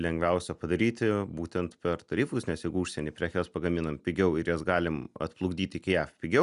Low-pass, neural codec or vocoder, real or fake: 10.8 kHz; none; real